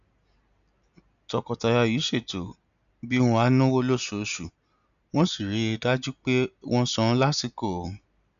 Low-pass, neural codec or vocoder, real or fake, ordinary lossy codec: 7.2 kHz; none; real; none